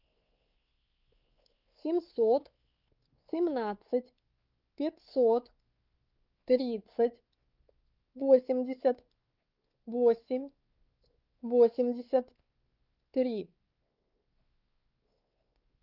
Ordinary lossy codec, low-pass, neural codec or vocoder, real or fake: Opus, 24 kbps; 5.4 kHz; codec, 16 kHz, 4 kbps, X-Codec, WavLM features, trained on Multilingual LibriSpeech; fake